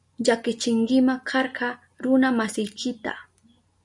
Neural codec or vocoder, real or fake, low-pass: none; real; 10.8 kHz